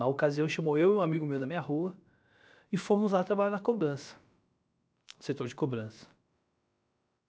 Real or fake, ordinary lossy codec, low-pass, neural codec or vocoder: fake; none; none; codec, 16 kHz, about 1 kbps, DyCAST, with the encoder's durations